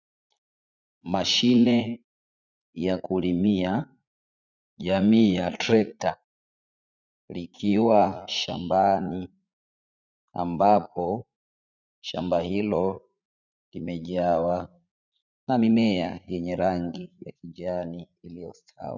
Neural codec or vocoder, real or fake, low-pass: vocoder, 44.1 kHz, 80 mel bands, Vocos; fake; 7.2 kHz